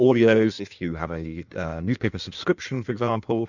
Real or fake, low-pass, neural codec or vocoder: fake; 7.2 kHz; codec, 16 kHz in and 24 kHz out, 1.1 kbps, FireRedTTS-2 codec